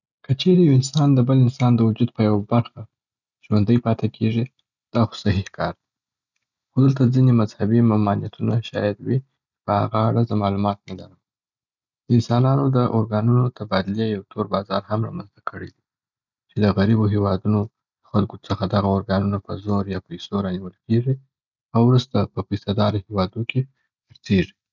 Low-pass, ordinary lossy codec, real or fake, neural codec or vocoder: 7.2 kHz; none; real; none